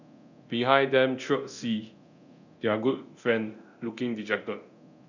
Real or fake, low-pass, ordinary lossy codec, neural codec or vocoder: fake; 7.2 kHz; none; codec, 24 kHz, 0.9 kbps, DualCodec